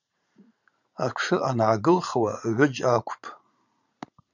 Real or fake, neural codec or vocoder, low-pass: fake; vocoder, 44.1 kHz, 80 mel bands, Vocos; 7.2 kHz